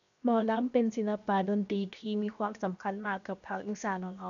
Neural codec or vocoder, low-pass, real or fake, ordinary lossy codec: codec, 16 kHz, 0.7 kbps, FocalCodec; 7.2 kHz; fake; none